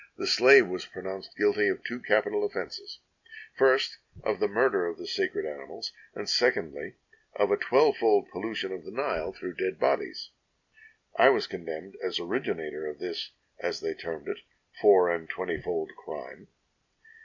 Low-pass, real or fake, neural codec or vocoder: 7.2 kHz; real; none